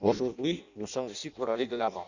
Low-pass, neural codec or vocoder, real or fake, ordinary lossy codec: 7.2 kHz; codec, 16 kHz in and 24 kHz out, 0.6 kbps, FireRedTTS-2 codec; fake; none